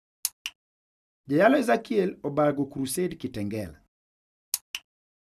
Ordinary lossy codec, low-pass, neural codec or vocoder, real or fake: none; 14.4 kHz; none; real